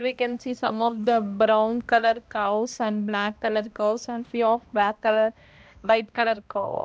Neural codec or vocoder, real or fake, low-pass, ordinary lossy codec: codec, 16 kHz, 1 kbps, X-Codec, HuBERT features, trained on balanced general audio; fake; none; none